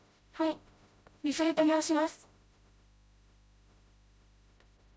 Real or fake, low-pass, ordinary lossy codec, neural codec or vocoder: fake; none; none; codec, 16 kHz, 0.5 kbps, FreqCodec, smaller model